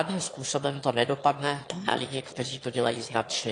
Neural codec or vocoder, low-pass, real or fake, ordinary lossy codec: autoencoder, 22.05 kHz, a latent of 192 numbers a frame, VITS, trained on one speaker; 9.9 kHz; fake; AAC, 48 kbps